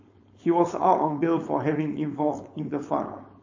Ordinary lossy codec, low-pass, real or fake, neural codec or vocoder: MP3, 32 kbps; 7.2 kHz; fake; codec, 16 kHz, 4.8 kbps, FACodec